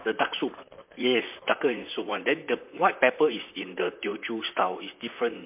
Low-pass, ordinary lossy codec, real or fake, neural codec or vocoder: 3.6 kHz; MP3, 32 kbps; fake; vocoder, 44.1 kHz, 128 mel bands, Pupu-Vocoder